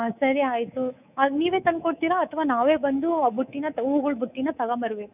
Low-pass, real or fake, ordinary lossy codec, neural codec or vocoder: 3.6 kHz; fake; none; codec, 24 kHz, 3.1 kbps, DualCodec